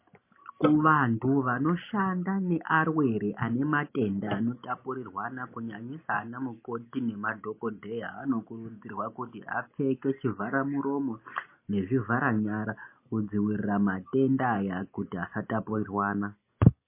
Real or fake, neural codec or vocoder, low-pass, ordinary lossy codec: real; none; 3.6 kHz; MP3, 24 kbps